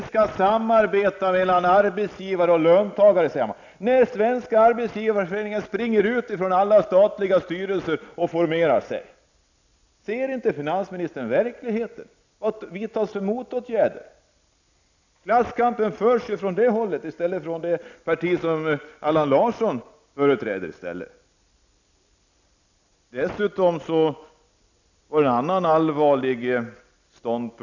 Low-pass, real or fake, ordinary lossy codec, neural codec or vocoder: 7.2 kHz; real; none; none